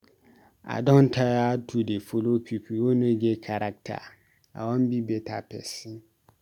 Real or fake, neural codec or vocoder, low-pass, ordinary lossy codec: real; none; 19.8 kHz; none